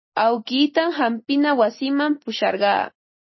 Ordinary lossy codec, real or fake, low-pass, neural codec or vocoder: MP3, 24 kbps; real; 7.2 kHz; none